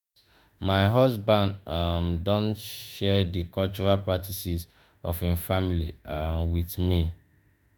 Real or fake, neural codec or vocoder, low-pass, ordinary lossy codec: fake; autoencoder, 48 kHz, 32 numbers a frame, DAC-VAE, trained on Japanese speech; none; none